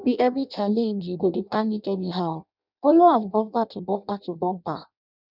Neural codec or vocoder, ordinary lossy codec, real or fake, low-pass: codec, 16 kHz in and 24 kHz out, 0.6 kbps, FireRedTTS-2 codec; none; fake; 5.4 kHz